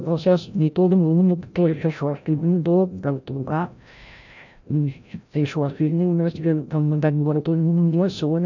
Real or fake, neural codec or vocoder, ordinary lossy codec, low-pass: fake; codec, 16 kHz, 0.5 kbps, FreqCodec, larger model; none; 7.2 kHz